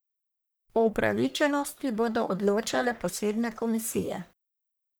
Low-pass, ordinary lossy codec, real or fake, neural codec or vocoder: none; none; fake; codec, 44.1 kHz, 1.7 kbps, Pupu-Codec